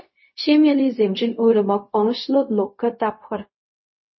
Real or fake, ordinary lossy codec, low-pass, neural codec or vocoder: fake; MP3, 24 kbps; 7.2 kHz; codec, 16 kHz, 0.4 kbps, LongCat-Audio-Codec